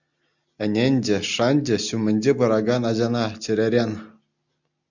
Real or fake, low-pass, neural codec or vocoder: real; 7.2 kHz; none